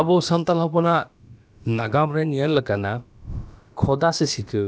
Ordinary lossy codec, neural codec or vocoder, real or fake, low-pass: none; codec, 16 kHz, about 1 kbps, DyCAST, with the encoder's durations; fake; none